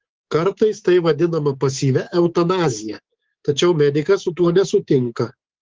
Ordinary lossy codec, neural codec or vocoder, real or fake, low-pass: Opus, 16 kbps; codec, 24 kHz, 3.1 kbps, DualCodec; fake; 7.2 kHz